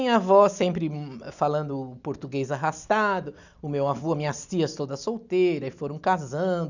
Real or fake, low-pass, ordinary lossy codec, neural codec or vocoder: real; 7.2 kHz; none; none